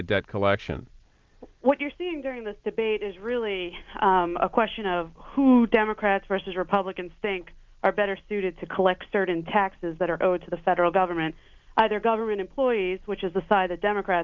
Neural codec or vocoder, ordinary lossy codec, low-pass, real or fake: none; Opus, 32 kbps; 7.2 kHz; real